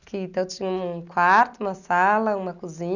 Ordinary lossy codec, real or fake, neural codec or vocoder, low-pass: none; real; none; 7.2 kHz